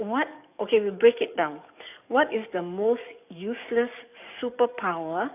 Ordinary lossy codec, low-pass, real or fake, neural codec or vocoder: none; 3.6 kHz; fake; codec, 44.1 kHz, 7.8 kbps, DAC